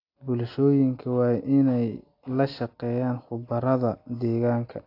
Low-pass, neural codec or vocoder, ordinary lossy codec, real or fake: 5.4 kHz; none; AAC, 32 kbps; real